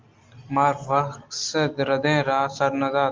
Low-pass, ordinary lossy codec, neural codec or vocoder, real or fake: 7.2 kHz; Opus, 32 kbps; none; real